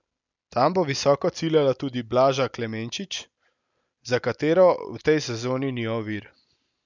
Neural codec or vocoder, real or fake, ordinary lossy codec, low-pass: none; real; none; 7.2 kHz